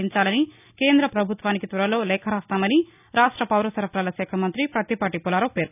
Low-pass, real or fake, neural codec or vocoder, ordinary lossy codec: 3.6 kHz; real; none; none